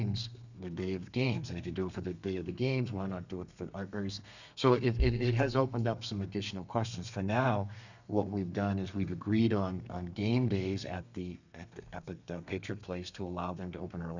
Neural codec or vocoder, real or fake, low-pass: codec, 32 kHz, 1.9 kbps, SNAC; fake; 7.2 kHz